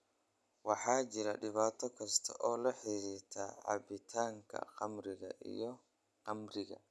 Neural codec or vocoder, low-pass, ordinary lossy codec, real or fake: none; none; none; real